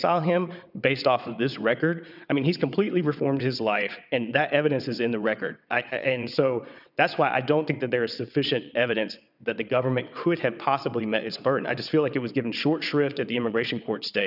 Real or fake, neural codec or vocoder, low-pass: fake; vocoder, 22.05 kHz, 80 mel bands, WaveNeXt; 5.4 kHz